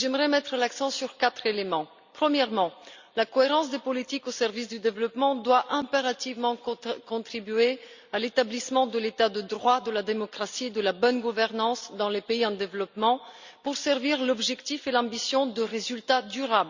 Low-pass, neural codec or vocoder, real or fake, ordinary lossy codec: 7.2 kHz; none; real; Opus, 64 kbps